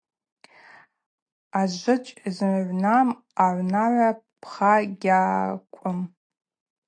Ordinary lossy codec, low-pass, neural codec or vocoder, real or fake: AAC, 48 kbps; 9.9 kHz; none; real